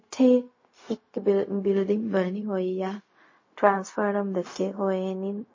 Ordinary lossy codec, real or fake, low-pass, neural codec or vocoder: MP3, 32 kbps; fake; 7.2 kHz; codec, 16 kHz, 0.4 kbps, LongCat-Audio-Codec